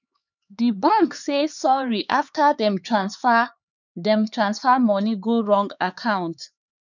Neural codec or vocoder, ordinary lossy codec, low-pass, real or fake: codec, 16 kHz, 4 kbps, X-Codec, HuBERT features, trained on LibriSpeech; none; 7.2 kHz; fake